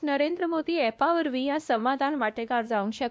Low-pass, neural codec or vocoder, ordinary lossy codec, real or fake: none; codec, 16 kHz, 1 kbps, X-Codec, WavLM features, trained on Multilingual LibriSpeech; none; fake